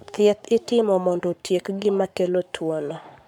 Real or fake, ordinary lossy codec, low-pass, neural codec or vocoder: fake; none; 19.8 kHz; codec, 44.1 kHz, 7.8 kbps, Pupu-Codec